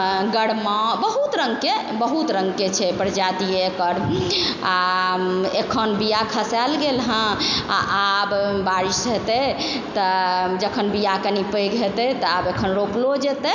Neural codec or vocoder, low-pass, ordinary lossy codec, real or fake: none; 7.2 kHz; none; real